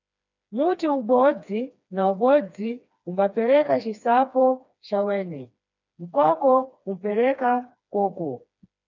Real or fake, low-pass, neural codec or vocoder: fake; 7.2 kHz; codec, 16 kHz, 2 kbps, FreqCodec, smaller model